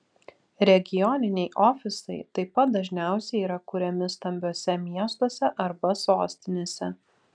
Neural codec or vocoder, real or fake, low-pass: none; real; 9.9 kHz